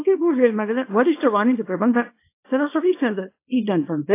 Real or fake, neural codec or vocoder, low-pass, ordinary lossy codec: fake; codec, 24 kHz, 0.9 kbps, WavTokenizer, small release; 3.6 kHz; AAC, 24 kbps